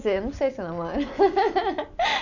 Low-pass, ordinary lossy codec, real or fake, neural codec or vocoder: 7.2 kHz; none; real; none